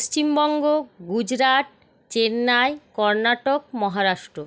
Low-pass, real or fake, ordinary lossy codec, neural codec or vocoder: none; real; none; none